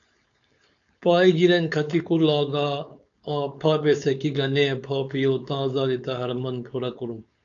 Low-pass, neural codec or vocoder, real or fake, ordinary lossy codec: 7.2 kHz; codec, 16 kHz, 4.8 kbps, FACodec; fake; AAC, 64 kbps